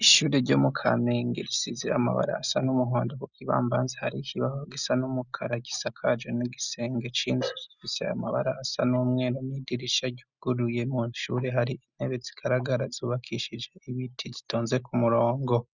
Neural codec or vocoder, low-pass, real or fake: none; 7.2 kHz; real